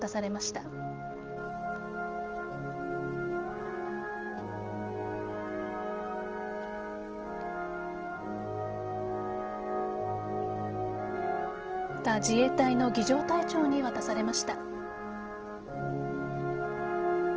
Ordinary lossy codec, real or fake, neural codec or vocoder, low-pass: Opus, 16 kbps; real; none; 7.2 kHz